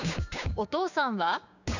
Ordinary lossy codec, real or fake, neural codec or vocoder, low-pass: none; fake; codec, 16 kHz, 6 kbps, DAC; 7.2 kHz